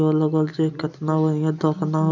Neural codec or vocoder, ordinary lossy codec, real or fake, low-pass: none; none; real; 7.2 kHz